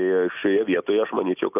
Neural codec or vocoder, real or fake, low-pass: none; real; 3.6 kHz